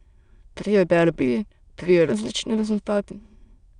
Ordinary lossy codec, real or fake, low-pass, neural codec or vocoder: Opus, 64 kbps; fake; 9.9 kHz; autoencoder, 22.05 kHz, a latent of 192 numbers a frame, VITS, trained on many speakers